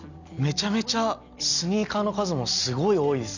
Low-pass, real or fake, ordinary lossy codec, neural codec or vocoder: 7.2 kHz; real; none; none